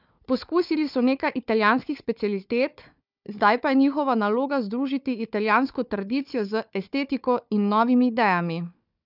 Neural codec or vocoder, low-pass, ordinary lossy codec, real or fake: codec, 16 kHz, 4 kbps, FunCodec, trained on Chinese and English, 50 frames a second; 5.4 kHz; none; fake